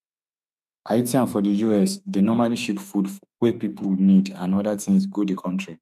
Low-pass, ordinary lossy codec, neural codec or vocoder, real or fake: 14.4 kHz; none; autoencoder, 48 kHz, 32 numbers a frame, DAC-VAE, trained on Japanese speech; fake